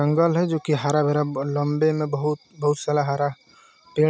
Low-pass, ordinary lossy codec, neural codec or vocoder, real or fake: none; none; none; real